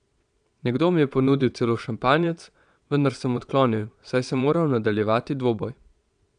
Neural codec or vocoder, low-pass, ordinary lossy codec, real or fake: vocoder, 22.05 kHz, 80 mel bands, Vocos; 9.9 kHz; none; fake